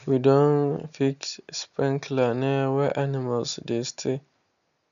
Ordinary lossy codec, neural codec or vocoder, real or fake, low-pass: none; none; real; 7.2 kHz